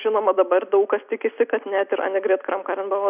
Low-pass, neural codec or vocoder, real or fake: 3.6 kHz; none; real